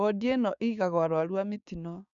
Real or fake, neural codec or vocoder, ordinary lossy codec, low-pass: fake; codec, 16 kHz, 6 kbps, DAC; none; 7.2 kHz